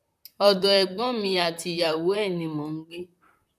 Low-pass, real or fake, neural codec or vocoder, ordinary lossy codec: 14.4 kHz; fake; vocoder, 44.1 kHz, 128 mel bands, Pupu-Vocoder; none